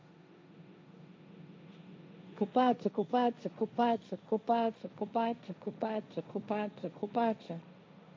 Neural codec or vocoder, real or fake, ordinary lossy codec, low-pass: codec, 16 kHz, 1.1 kbps, Voila-Tokenizer; fake; none; none